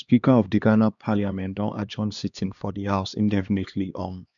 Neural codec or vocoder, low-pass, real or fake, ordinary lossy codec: codec, 16 kHz, 2 kbps, X-Codec, HuBERT features, trained on LibriSpeech; 7.2 kHz; fake; none